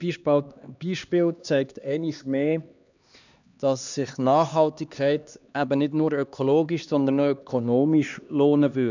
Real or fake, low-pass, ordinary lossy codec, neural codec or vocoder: fake; 7.2 kHz; none; codec, 16 kHz, 2 kbps, X-Codec, HuBERT features, trained on LibriSpeech